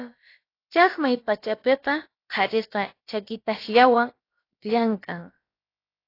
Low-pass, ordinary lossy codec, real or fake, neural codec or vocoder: 5.4 kHz; AAC, 32 kbps; fake; codec, 16 kHz, about 1 kbps, DyCAST, with the encoder's durations